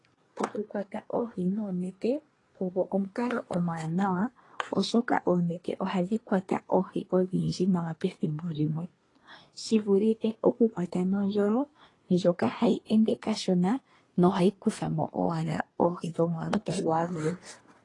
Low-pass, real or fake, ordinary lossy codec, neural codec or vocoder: 10.8 kHz; fake; AAC, 32 kbps; codec, 24 kHz, 1 kbps, SNAC